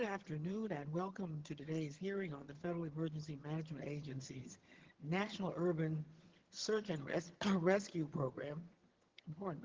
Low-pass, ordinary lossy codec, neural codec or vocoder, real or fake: 7.2 kHz; Opus, 16 kbps; vocoder, 22.05 kHz, 80 mel bands, HiFi-GAN; fake